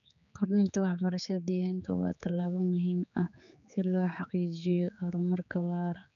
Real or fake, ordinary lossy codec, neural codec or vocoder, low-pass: fake; none; codec, 16 kHz, 4 kbps, X-Codec, HuBERT features, trained on general audio; 7.2 kHz